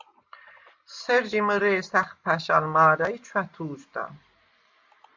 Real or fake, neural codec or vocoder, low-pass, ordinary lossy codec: real; none; 7.2 kHz; MP3, 64 kbps